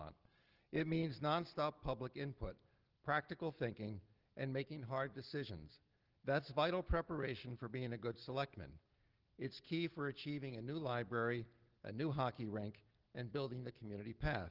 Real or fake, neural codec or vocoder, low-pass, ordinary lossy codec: real; none; 5.4 kHz; Opus, 16 kbps